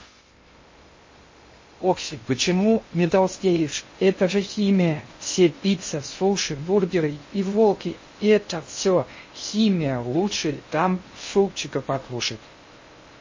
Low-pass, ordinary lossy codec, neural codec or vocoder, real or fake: 7.2 kHz; MP3, 32 kbps; codec, 16 kHz in and 24 kHz out, 0.6 kbps, FocalCodec, streaming, 2048 codes; fake